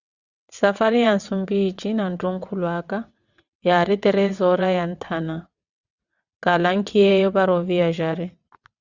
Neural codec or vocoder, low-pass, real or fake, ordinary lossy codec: vocoder, 22.05 kHz, 80 mel bands, WaveNeXt; 7.2 kHz; fake; Opus, 64 kbps